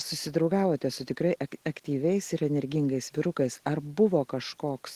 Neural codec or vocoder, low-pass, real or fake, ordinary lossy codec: none; 14.4 kHz; real; Opus, 16 kbps